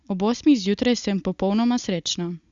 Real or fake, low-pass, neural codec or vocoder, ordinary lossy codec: real; 7.2 kHz; none; Opus, 64 kbps